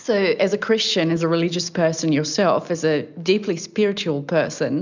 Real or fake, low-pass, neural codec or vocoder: real; 7.2 kHz; none